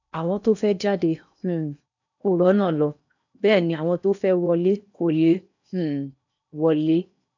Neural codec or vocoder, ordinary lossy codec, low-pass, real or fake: codec, 16 kHz in and 24 kHz out, 0.8 kbps, FocalCodec, streaming, 65536 codes; none; 7.2 kHz; fake